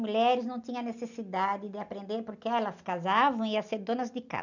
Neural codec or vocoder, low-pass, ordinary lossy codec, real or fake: none; 7.2 kHz; none; real